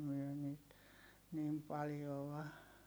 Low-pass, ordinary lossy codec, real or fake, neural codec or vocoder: none; none; real; none